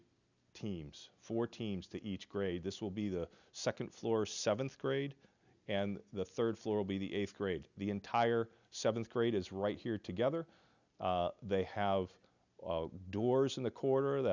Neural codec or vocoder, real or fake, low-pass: none; real; 7.2 kHz